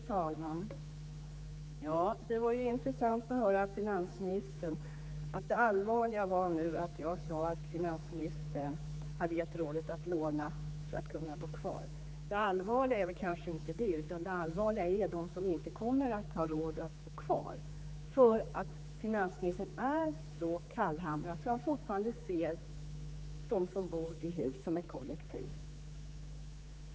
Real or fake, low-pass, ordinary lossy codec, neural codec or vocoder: fake; none; none; codec, 16 kHz, 4 kbps, X-Codec, HuBERT features, trained on general audio